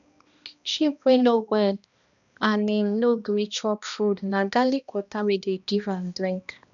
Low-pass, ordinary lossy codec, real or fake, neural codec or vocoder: 7.2 kHz; none; fake; codec, 16 kHz, 1 kbps, X-Codec, HuBERT features, trained on balanced general audio